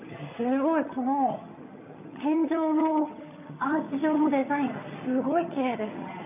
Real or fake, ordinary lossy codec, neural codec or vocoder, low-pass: fake; none; vocoder, 22.05 kHz, 80 mel bands, HiFi-GAN; 3.6 kHz